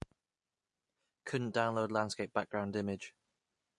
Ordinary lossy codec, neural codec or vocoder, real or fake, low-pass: MP3, 48 kbps; none; real; 10.8 kHz